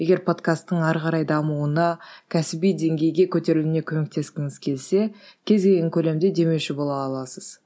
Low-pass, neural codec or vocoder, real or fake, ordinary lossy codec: none; none; real; none